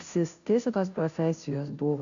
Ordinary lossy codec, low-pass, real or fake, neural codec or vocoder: MP3, 96 kbps; 7.2 kHz; fake; codec, 16 kHz, 0.5 kbps, FunCodec, trained on Chinese and English, 25 frames a second